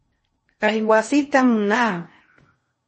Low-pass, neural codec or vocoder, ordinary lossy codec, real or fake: 10.8 kHz; codec, 16 kHz in and 24 kHz out, 0.6 kbps, FocalCodec, streaming, 4096 codes; MP3, 32 kbps; fake